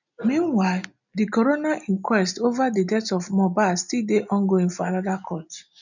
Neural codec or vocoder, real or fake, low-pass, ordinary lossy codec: none; real; 7.2 kHz; none